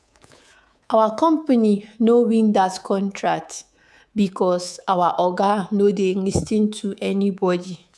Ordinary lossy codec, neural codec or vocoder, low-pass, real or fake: none; codec, 24 kHz, 3.1 kbps, DualCodec; none; fake